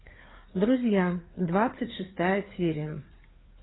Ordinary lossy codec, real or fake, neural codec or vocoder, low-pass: AAC, 16 kbps; fake; codec, 16 kHz, 4 kbps, FreqCodec, larger model; 7.2 kHz